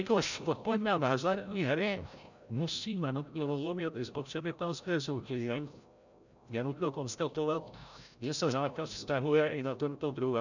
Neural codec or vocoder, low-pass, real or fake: codec, 16 kHz, 0.5 kbps, FreqCodec, larger model; 7.2 kHz; fake